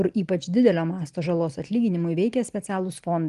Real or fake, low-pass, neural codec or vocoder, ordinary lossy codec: real; 10.8 kHz; none; Opus, 24 kbps